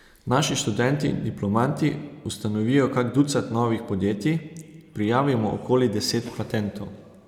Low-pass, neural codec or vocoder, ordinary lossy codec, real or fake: 19.8 kHz; none; none; real